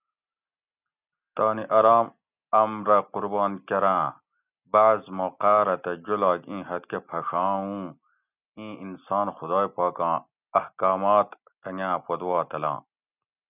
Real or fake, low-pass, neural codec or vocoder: real; 3.6 kHz; none